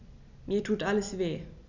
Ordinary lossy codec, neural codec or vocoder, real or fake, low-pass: none; none; real; 7.2 kHz